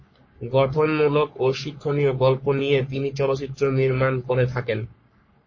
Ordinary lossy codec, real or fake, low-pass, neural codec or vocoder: MP3, 32 kbps; fake; 7.2 kHz; codec, 44.1 kHz, 3.4 kbps, Pupu-Codec